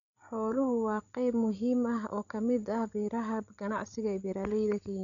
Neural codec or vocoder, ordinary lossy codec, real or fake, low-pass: codec, 16 kHz, 16 kbps, FreqCodec, larger model; none; fake; 7.2 kHz